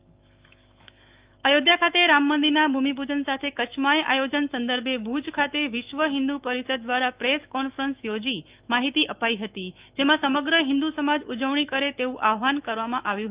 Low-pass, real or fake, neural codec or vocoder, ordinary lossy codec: 3.6 kHz; fake; autoencoder, 48 kHz, 128 numbers a frame, DAC-VAE, trained on Japanese speech; Opus, 24 kbps